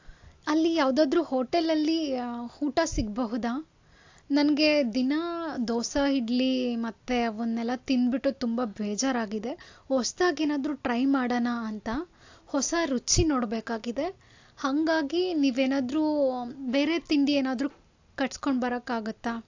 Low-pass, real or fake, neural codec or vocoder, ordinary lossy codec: 7.2 kHz; real; none; AAC, 48 kbps